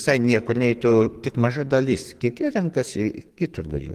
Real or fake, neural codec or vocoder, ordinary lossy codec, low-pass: fake; codec, 44.1 kHz, 2.6 kbps, SNAC; Opus, 24 kbps; 14.4 kHz